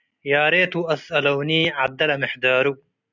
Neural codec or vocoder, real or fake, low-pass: none; real; 7.2 kHz